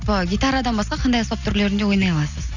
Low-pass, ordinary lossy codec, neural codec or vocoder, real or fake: 7.2 kHz; none; none; real